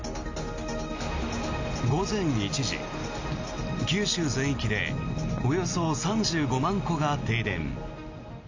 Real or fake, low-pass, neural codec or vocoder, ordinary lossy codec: real; 7.2 kHz; none; AAC, 48 kbps